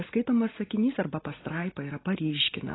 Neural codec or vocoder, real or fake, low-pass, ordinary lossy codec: none; real; 7.2 kHz; AAC, 16 kbps